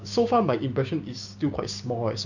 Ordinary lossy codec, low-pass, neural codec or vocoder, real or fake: none; 7.2 kHz; codec, 16 kHz, 6 kbps, DAC; fake